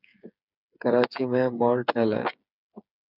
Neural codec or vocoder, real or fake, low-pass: codec, 16 kHz, 8 kbps, FreqCodec, smaller model; fake; 5.4 kHz